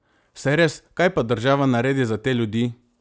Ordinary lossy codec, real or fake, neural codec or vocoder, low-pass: none; real; none; none